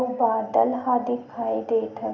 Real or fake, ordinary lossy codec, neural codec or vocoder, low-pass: real; none; none; 7.2 kHz